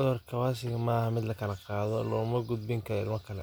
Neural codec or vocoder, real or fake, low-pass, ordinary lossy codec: none; real; none; none